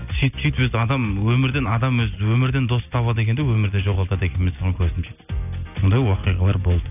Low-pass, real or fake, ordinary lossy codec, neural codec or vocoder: 3.6 kHz; real; none; none